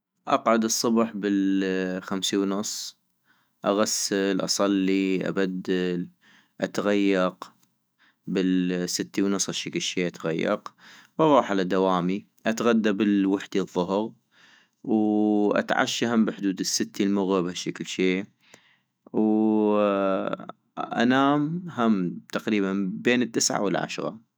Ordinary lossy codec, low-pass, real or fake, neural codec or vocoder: none; none; fake; autoencoder, 48 kHz, 128 numbers a frame, DAC-VAE, trained on Japanese speech